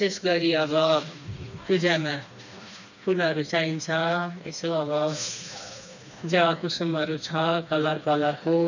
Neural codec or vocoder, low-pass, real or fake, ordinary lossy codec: codec, 16 kHz, 2 kbps, FreqCodec, smaller model; 7.2 kHz; fake; none